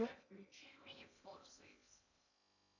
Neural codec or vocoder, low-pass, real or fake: codec, 16 kHz in and 24 kHz out, 0.8 kbps, FocalCodec, streaming, 65536 codes; 7.2 kHz; fake